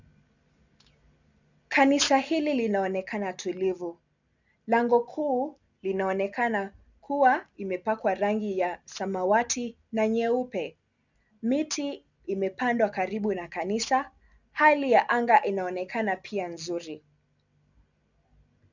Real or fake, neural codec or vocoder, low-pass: real; none; 7.2 kHz